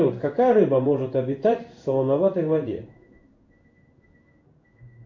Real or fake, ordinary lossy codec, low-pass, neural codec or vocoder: fake; MP3, 48 kbps; 7.2 kHz; codec, 16 kHz in and 24 kHz out, 1 kbps, XY-Tokenizer